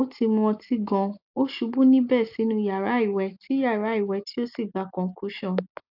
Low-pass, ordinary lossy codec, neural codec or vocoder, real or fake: 5.4 kHz; AAC, 48 kbps; none; real